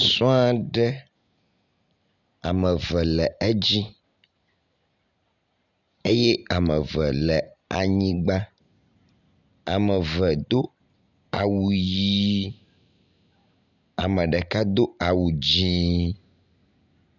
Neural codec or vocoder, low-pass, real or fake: none; 7.2 kHz; real